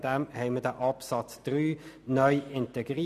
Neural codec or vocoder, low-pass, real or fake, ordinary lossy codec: none; 14.4 kHz; real; none